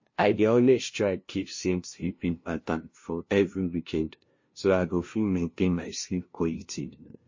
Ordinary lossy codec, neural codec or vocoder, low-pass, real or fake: MP3, 32 kbps; codec, 16 kHz, 0.5 kbps, FunCodec, trained on LibriTTS, 25 frames a second; 7.2 kHz; fake